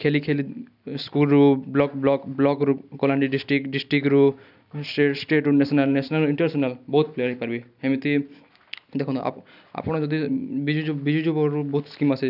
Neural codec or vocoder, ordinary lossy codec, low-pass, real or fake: none; none; 5.4 kHz; real